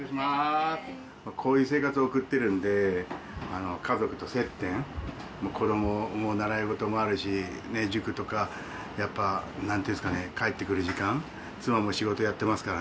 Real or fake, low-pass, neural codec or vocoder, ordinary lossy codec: real; none; none; none